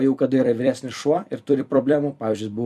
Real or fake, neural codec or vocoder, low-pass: fake; vocoder, 44.1 kHz, 128 mel bands every 256 samples, BigVGAN v2; 14.4 kHz